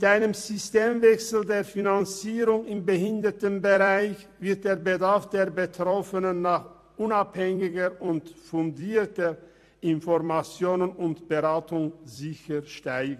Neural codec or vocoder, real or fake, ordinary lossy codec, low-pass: vocoder, 44.1 kHz, 128 mel bands every 256 samples, BigVGAN v2; fake; MP3, 64 kbps; 14.4 kHz